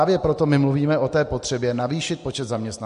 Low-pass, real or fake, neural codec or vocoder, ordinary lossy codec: 14.4 kHz; real; none; MP3, 48 kbps